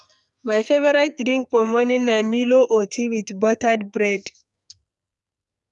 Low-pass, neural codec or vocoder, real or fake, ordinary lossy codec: 10.8 kHz; codec, 44.1 kHz, 2.6 kbps, SNAC; fake; none